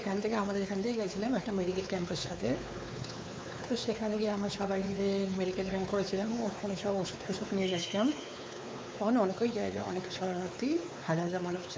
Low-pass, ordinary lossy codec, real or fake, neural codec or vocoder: none; none; fake; codec, 16 kHz, 4 kbps, X-Codec, WavLM features, trained on Multilingual LibriSpeech